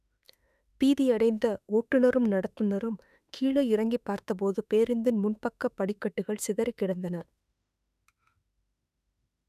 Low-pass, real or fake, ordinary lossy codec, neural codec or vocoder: 14.4 kHz; fake; none; autoencoder, 48 kHz, 32 numbers a frame, DAC-VAE, trained on Japanese speech